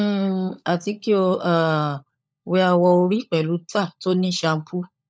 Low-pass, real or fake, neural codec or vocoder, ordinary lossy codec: none; fake; codec, 16 kHz, 16 kbps, FunCodec, trained on LibriTTS, 50 frames a second; none